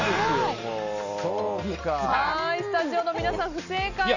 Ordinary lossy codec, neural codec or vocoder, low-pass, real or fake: none; none; 7.2 kHz; real